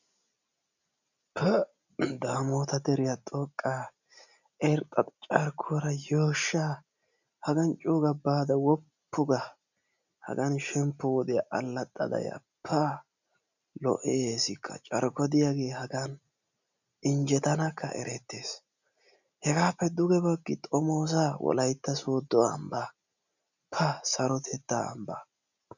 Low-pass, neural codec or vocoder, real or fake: 7.2 kHz; none; real